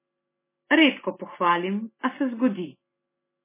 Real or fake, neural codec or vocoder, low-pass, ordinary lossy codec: real; none; 3.6 kHz; MP3, 16 kbps